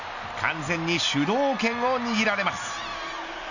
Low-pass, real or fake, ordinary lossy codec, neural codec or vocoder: 7.2 kHz; real; none; none